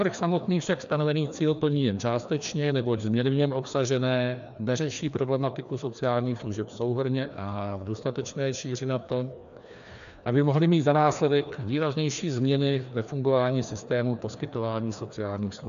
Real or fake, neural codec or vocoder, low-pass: fake; codec, 16 kHz, 2 kbps, FreqCodec, larger model; 7.2 kHz